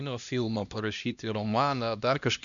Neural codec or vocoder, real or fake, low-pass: codec, 16 kHz, 1 kbps, X-Codec, HuBERT features, trained on LibriSpeech; fake; 7.2 kHz